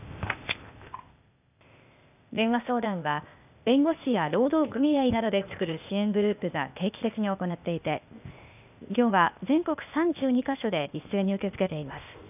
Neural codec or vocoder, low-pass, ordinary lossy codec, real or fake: codec, 16 kHz, 0.8 kbps, ZipCodec; 3.6 kHz; none; fake